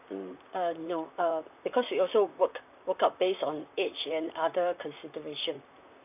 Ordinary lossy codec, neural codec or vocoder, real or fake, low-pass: none; vocoder, 44.1 kHz, 128 mel bands, Pupu-Vocoder; fake; 3.6 kHz